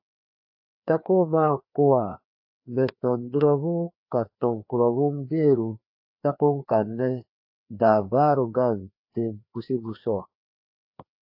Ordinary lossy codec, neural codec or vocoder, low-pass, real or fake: AAC, 48 kbps; codec, 16 kHz, 2 kbps, FreqCodec, larger model; 5.4 kHz; fake